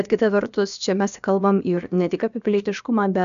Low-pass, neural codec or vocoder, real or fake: 7.2 kHz; codec, 16 kHz, about 1 kbps, DyCAST, with the encoder's durations; fake